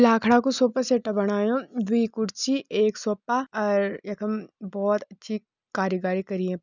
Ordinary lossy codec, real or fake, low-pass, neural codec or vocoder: none; real; 7.2 kHz; none